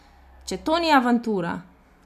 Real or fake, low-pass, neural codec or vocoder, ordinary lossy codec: real; 14.4 kHz; none; none